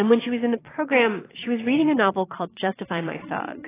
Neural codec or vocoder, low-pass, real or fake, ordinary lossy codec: vocoder, 22.05 kHz, 80 mel bands, Vocos; 3.6 kHz; fake; AAC, 16 kbps